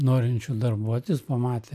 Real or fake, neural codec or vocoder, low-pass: real; none; 14.4 kHz